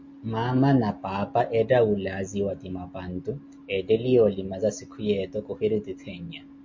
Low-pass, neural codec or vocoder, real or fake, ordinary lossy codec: 7.2 kHz; none; real; MP3, 48 kbps